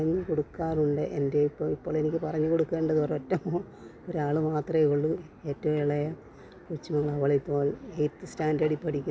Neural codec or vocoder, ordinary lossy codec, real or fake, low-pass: none; none; real; none